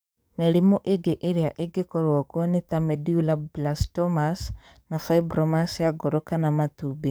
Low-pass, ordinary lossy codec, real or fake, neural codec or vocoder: none; none; fake; codec, 44.1 kHz, 7.8 kbps, DAC